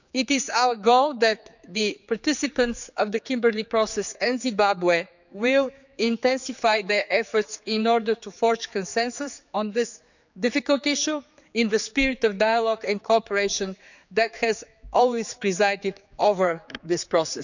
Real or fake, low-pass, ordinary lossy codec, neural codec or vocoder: fake; 7.2 kHz; none; codec, 16 kHz, 4 kbps, X-Codec, HuBERT features, trained on general audio